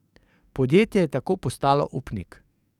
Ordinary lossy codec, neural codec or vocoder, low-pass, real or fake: none; codec, 44.1 kHz, 7.8 kbps, DAC; 19.8 kHz; fake